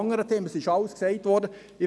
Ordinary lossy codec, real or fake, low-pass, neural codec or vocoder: none; real; none; none